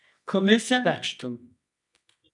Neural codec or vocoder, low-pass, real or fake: codec, 24 kHz, 0.9 kbps, WavTokenizer, medium music audio release; 10.8 kHz; fake